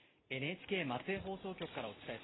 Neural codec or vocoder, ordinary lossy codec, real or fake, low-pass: none; AAC, 16 kbps; real; 7.2 kHz